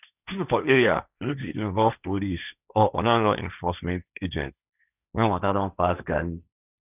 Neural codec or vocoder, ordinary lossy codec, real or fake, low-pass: codec, 16 kHz, 1.1 kbps, Voila-Tokenizer; none; fake; 3.6 kHz